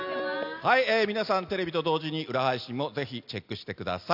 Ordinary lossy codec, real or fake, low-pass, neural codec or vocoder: none; real; 5.4 kHz; none